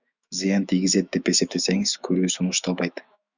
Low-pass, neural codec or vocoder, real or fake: 7.2 kHz; autoencoder, 48 kHz, 128 numbers a frame, DAC-VAE, trained on Japanese speech; fake